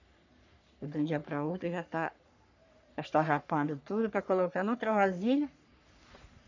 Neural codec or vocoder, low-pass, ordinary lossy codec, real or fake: codec, 44.1 kHz, 3.4 kbps, Pupu-Codec; 7.2 kHz; none; fake